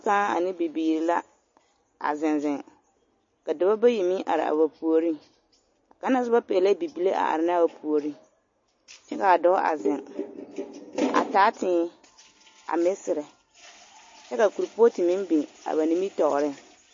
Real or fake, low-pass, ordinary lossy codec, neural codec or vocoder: real; 7.2 kHz; MP3, 32 kbps; none